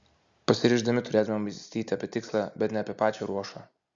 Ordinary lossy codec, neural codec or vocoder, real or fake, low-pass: Opus, 64 kbps; none; real; 7.2 kHz